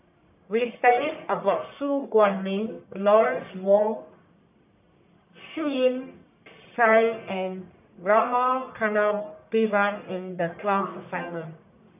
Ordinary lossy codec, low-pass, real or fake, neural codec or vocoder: none; 3.6 kHz; fake; codec, 44.1 kHz, 1.7 kbps, Pupu-Codec